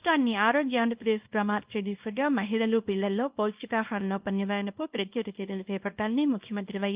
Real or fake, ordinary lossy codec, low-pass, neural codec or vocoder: fake; Opus, 32 kbps; 3.6 kHz; codec, 24 kHz, 0.9 kbps, WavTokenizer, small release